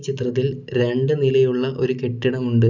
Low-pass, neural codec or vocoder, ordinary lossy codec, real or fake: 7.2 kHz; none; none; real